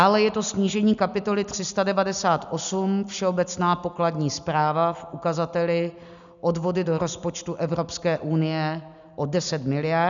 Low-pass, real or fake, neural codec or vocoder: 7.2 kHz; real; none